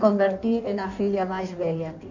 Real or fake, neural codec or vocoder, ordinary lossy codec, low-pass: fake; codec, 16 kHz in and 24 kHz out, 1.1 kbps, FireRedTTS-2 codec; none; 7.2 kHz